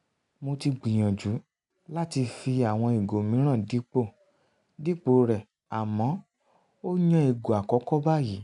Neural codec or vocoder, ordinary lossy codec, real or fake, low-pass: none; none; real; 10.8 kHz